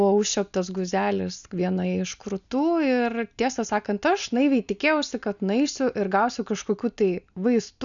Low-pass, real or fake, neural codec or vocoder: 7.2 kHz; real; none